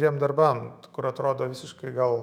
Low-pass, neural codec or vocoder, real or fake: 19.8 kHz; autoencoder, 48 kHz, 128 numbers a frame, DAC-VAE, trained on Japanese speech; fake